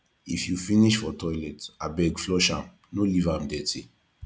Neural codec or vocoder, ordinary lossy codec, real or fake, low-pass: none; none; real; none